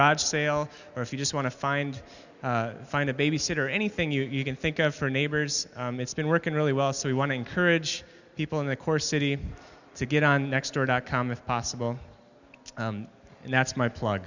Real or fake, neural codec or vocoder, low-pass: real; none; 7.2 kHz